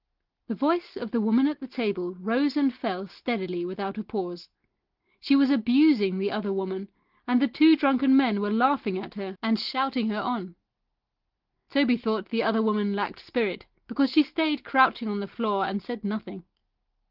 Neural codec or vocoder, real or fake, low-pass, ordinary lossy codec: none; real; 5.4 kHz; Opus, 16 kbps